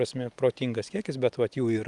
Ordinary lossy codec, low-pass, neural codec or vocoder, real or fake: Opus, 32 kbps; 10.8 kHz; none; real